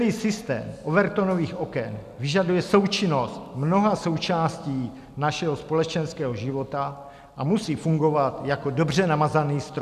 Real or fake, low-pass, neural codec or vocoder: real; 14.4 kHz; none